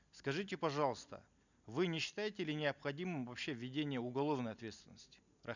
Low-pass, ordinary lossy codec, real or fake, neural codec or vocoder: 7.2 kHz; none; real; none